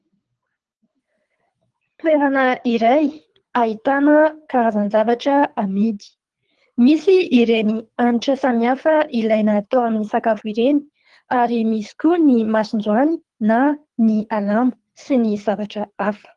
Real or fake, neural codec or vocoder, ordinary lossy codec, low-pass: fake; codec, 24 kHz, 3 kbps, HILCodec; Opus, 24 kbps; 10.8 kHz